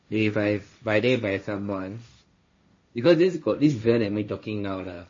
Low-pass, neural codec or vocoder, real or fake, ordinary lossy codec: 7.2 kHz; codec, 16 kHz, 1.1 kbps, Voila-Tokenizer; fake; MP3, 32 kbps